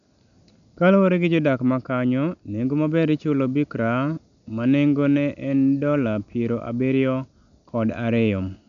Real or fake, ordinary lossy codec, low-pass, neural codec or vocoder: real; none; 7.2 kHz; none